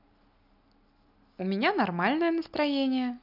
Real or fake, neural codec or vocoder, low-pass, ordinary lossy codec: real; none; 5.4 kHz; none